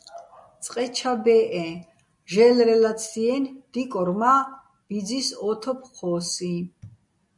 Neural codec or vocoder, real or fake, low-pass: none; real; 10.8 kHz